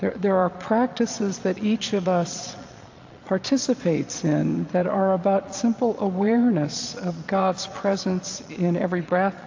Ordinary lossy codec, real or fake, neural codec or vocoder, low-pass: AAC, 48 kbps; fake; vocoder, 22.05 kHz, 80 mel bands, Vocos; 7.2 kHz